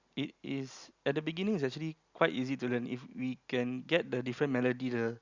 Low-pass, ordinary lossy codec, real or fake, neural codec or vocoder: 7.2 kHz; Opus, 64 kbps; real; none